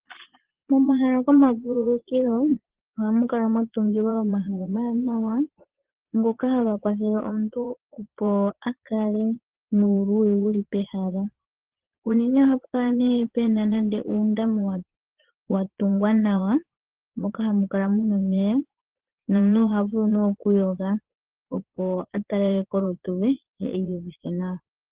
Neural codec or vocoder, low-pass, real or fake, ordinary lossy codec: vocoder, 22.05 kHz, 80 mel bands, Vocos; 3.6 kHz; fake; Opus, 16 kbps